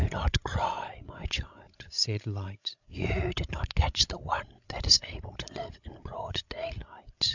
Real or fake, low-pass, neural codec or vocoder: fake; 7.2 kHz; codec, 16 kHz, 16 kbps, FreqCodec, larger model